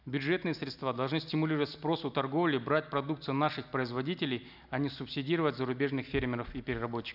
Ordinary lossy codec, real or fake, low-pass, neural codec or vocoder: none; real; 5.4 kHz; none